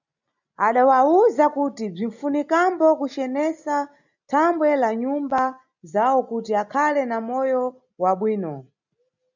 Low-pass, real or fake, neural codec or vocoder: 7.2 kHz; real; none